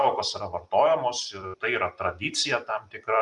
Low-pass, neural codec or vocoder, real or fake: 10.8 kHz; vocoder, 44.1 kHz, 128 mel bands every 256 samples, BigVGAN v2; fake